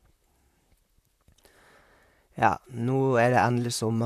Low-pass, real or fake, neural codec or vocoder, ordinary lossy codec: 14.4 kHz; fake; vocoder, 44.1 kHz, 128 mel bands, Pupu-Vocoder; MP3, 64 kbps